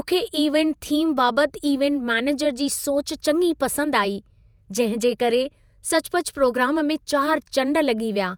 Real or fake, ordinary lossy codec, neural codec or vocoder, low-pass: fake; none; vocoder, 48 kHz, 128 mel bands, Vocos; none